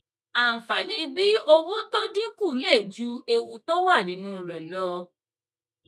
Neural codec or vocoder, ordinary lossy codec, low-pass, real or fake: codec, 24 kHz, 0.9 kbps, WavTokenizer, medium music audio release; none; none; fake